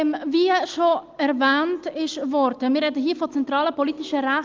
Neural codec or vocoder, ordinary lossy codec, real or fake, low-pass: none; Opus, 32 kbps; real; 7.2 kHz